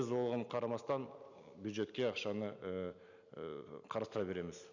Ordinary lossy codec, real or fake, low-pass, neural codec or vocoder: none; real; 7.2 kHz; none